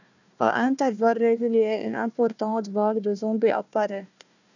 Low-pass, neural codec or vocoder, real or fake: 7.2 kHz; codec, 16 kHz, 1 kbps, FunCodec, trained on Chinese and English, 50 frames a second; fake